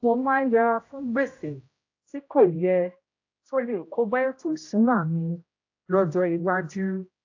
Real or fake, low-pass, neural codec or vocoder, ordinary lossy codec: fake; 7.2 kHz; codec, 16 kHz, 0.5 kbps, X-Codec, HuBERT features, trained on general audio; AAC, 48 kbps